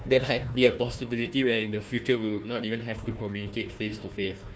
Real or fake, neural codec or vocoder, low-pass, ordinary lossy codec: fake; codec, 16 kHz, 1 kbps, FunCodec, trained on Chinese and English, 50 frames a second; none; none